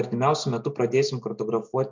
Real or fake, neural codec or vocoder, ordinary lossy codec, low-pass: real; none; MP3, 64 kbps; 7.2 kHz